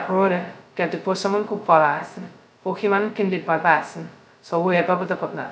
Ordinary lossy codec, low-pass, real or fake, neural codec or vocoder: none; none; fake; codec, 16 kHz, 0.2 kbps, FocalCodec